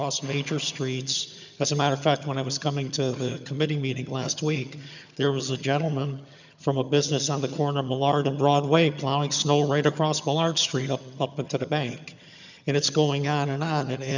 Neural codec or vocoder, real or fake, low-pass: vocoder, 22.05 kHz, 80 mel bands, HiFi-GAN; fake; 7.2 kHz